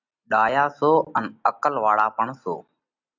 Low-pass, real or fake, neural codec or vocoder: 7.2 kHz; real; none